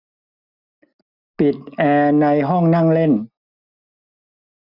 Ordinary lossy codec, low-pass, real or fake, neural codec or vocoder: none; 5.4 kHz; real; none